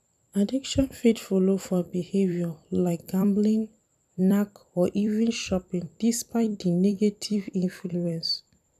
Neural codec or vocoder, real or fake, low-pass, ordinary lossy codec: vocoder, 44.1 kHz, 128 mel bands every 256 samples, BigVGAN v2; fake; 14.4 kHz; none